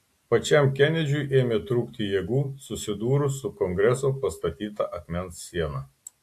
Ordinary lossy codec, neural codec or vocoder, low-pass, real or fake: AAC, 64 kbps; none; 14.4 kHz; real